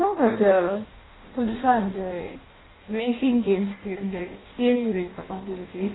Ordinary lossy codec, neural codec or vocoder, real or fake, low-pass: AAC, 16 kbps; codec, 16 kHz in and 24 kHz out, 0.6 kbps, FireRedTTS-2 codec; fake; 7.2 kHz